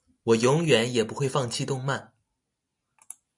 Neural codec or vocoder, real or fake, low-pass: none; real; 10.8 kHz